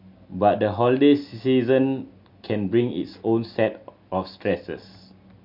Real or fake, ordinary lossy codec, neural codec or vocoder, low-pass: real; MP3, 48 kbps; none; 5.4 kHz